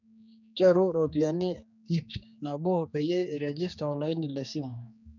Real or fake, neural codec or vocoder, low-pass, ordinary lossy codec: fake; codec, 16 kHz, 2 kbps, X-Codec, HuBERT features, trained on general audio; 7.2 kHz; none